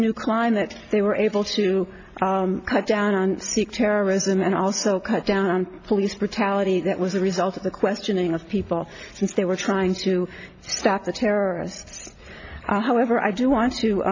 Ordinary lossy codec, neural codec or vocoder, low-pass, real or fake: AAC, 48 kbps; none; 7.2 kHz; real